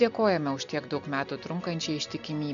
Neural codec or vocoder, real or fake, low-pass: none; real; 7.2 kHz